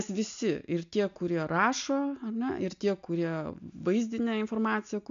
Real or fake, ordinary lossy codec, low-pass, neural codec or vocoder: real; MP3, 64 kbps; 7.2 kHz; none